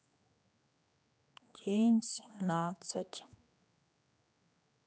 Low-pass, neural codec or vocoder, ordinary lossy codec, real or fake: none; codec, 16 kHz, 2 kbps, X-Codec, HuBERT features, trained on general audio; none; fake